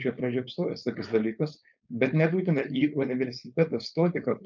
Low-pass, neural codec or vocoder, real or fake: 7.2 kHz; codec, 16 kHz, 4.8 kbps, FACodec; fake